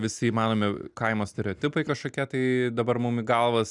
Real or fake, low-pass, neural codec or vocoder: real; 10.8 kHz; none